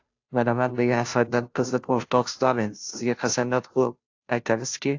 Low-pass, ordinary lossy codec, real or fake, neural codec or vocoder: 7.2 kHz; AAC, 48 kbps; fake; codec, 16 kHz, 0.5 kbps, FunCodec, trained on Chinese and English, 25 frames a second